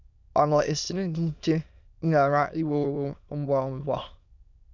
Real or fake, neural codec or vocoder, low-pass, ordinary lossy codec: fake; autoencoder, 22.05 kHz, a latent of 192 numbers a frame, VITS, trained on many speakers; 7.2 kHz; none